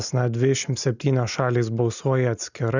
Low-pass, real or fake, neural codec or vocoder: 7.2 kHz; real; none